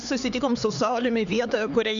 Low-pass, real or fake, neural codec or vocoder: 7.2 kHz; fake; codec, 16 kHz, 4 kbps, X-Codec, HuBERT features, trained on LibriSpeech